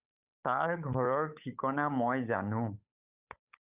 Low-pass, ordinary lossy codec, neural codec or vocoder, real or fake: 3.6 kHz; Opus, 64 kbps; codec, 16 kHz, 8 kbps, FunCodec, trained on Chinese and English, 25 frames a second; fake